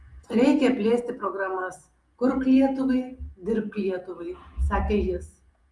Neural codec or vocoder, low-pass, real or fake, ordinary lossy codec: vocoder, 48 kHz, 128 mel bands, Vocos; 10.8 kHz; fake; Opus, 32 kbps